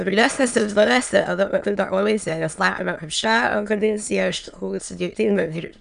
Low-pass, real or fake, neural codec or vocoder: 9.9 kHz; fake; autoencoder, 22.05 kHz, a latent of 192 numbers a frame, VITS, trained on many speakers